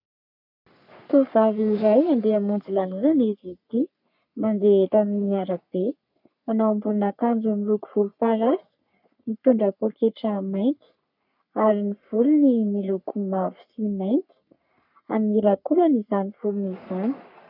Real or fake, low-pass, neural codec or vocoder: fake; 5.4 kHz; codec, 44.1 kHz, 3.4 kbps, Pupu-Codec